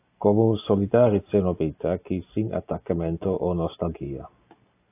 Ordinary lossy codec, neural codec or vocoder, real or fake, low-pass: AAC, 24 kbps; none; real; 3.6 kHz